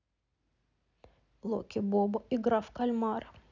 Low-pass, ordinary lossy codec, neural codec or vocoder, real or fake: 7.2 kHz; none; none; real